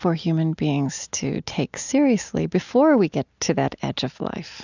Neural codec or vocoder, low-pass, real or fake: none; 7.2 kHz; real